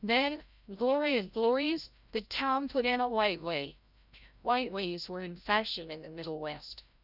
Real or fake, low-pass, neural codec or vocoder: fake; 5.4 kHz; codec, 16 kHz, 0.5 kbps, FreqCodec, larger model